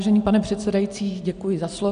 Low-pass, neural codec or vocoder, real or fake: 9.9 kHz; none; real